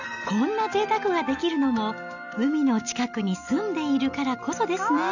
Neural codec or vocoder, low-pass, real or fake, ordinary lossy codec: none; 7.2 kHz; real; none